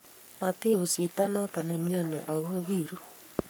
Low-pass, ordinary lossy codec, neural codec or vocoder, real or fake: none; none; codec, 44.1 kHz, 3.4 kbps, Pupu-Codec; fake